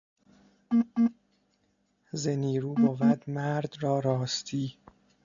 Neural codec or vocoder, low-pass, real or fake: none; 7.2 kHz; real